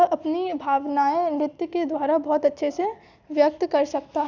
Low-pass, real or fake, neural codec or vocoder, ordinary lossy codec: 7.2 kHz; real; none; none